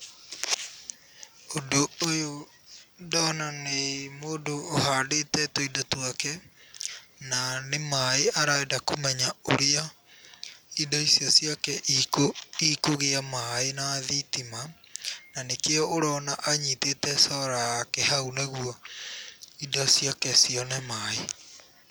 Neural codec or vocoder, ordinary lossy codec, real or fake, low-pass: none; none; real; none